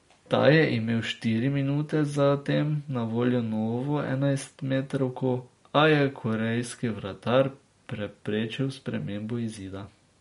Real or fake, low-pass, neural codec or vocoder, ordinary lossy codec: real; 10.8 kHz; none; MP3, 48 kbps